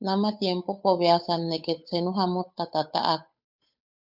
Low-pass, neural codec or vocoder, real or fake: 5.4 kHz; codec, 16 kHz, 8 kbps, FunCodec, trained on Chinese and English, 25 frames a second; fake